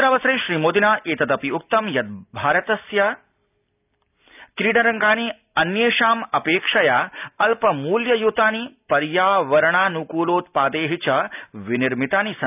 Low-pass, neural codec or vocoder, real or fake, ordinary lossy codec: 3.6 kHz; none; real; none